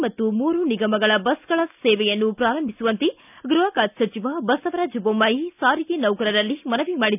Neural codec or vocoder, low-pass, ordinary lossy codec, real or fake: none; 3.6 kHz; none; real